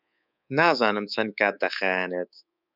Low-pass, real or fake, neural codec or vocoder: 5.4 kHz; fake; codec, 24 kHz, 3.1 kbps, DualCodec